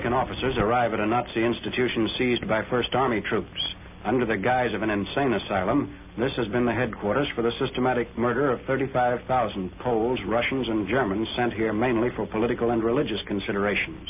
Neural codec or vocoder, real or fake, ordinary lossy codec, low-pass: none; real; MP3, 32 kbps; 3.6 kHz